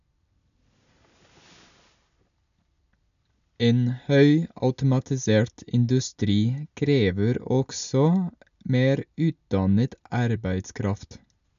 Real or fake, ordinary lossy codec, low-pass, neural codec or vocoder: real; none; 7.2 kHz; none